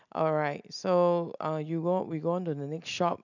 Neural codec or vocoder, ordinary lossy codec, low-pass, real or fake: none; none; 7.2 kHz; real